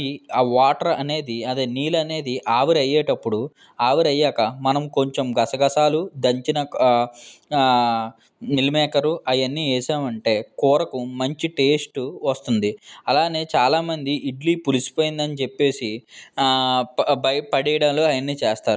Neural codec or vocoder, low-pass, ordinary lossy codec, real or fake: none; none; none; real